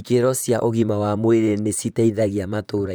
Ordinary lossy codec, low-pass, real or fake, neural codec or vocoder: none; none; fake; vocoder, 44.1 kHz, 128 mel bands, Pupu-Vocoder